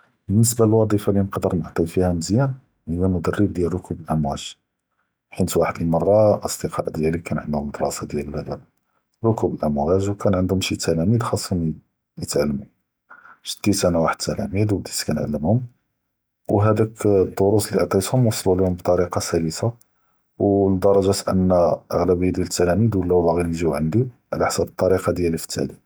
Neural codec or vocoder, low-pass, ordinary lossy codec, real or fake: none; none; none; real